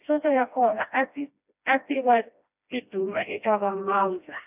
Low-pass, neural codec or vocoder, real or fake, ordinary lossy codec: 3.6 kHz; codec, 16 kHz, 1 kbps, FreqCodec, smaller model; fake; none